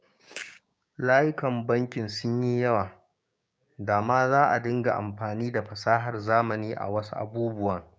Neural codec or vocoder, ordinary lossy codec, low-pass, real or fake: codec, 16 kHz, 6 kbps, DAC; none; none; fake